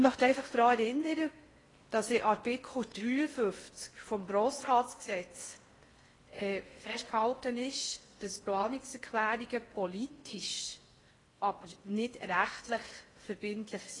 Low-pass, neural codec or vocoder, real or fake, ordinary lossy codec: 10.8 kHz; codec, 16 kHz in and 24 kHz out, 0.6 kbps, FocalCodec, streaming, 4096 codes; fake; AAC, 32 kbps